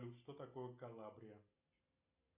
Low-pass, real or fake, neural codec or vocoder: 3.6 kHz; real; none